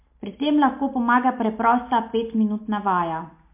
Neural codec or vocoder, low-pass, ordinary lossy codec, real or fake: none; 3.6 kHz; MP3, 32 kbps; real